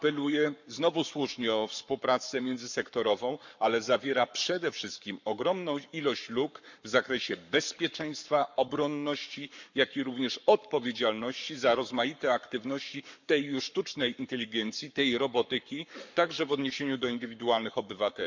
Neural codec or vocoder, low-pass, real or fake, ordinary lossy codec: codec, 44.1 kHz, 7.8 kbps, Pupu-Codec; 7.2 kHz; fake; none